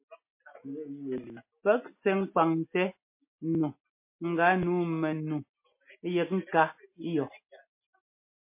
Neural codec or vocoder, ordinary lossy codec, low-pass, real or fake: none; MP3, 24 kbps; 3.6 kHz; real